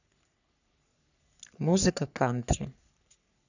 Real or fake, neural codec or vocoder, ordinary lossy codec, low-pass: fake; codec, 44.1 kHz, 3.4 kbps, Pupu-Codec; none; 7.2 kHz